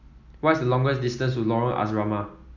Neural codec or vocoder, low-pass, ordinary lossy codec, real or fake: none; 7.2 kHz; none; real